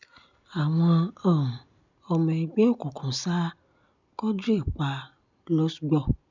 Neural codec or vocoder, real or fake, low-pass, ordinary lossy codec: none; real; 7.2 kHz; none